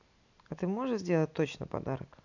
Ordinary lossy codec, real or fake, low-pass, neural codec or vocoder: none; real; 7.2 kHz; none